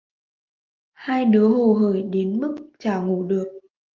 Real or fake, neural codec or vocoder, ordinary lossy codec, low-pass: real; none; Opus, 32 kbps; 7.2 kHz